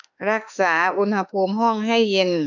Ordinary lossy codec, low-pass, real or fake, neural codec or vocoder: none; 7.2 kHz; fake; autoencoder, 48 kHz, 32 numbers a frame, DAC-VAE, trained on Japanese speech